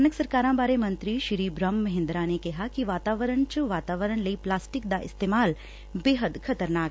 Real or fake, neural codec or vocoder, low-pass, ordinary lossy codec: real; none; none; none